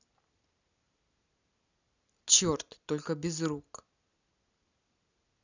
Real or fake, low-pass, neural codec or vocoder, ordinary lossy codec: real; 7.2 kHz; none; none